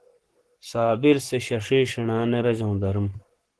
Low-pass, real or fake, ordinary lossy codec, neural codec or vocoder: 10.8 kHz; fake; Opus, 16 kbps; codec, 44.1 kHz, 7.8 kbps, Pupu-Codec